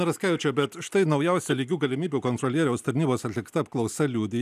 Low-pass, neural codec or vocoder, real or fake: 14.4 kHz; none; real